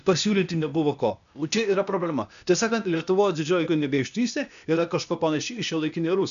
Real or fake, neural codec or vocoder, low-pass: fake; codec, 16 kHz, 0.8 kbps, ZipCodec; 7.2 kHz